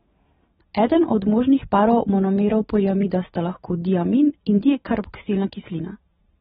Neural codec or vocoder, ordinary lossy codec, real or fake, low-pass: none; AAC, 16 kbps; real; 19.8 kHz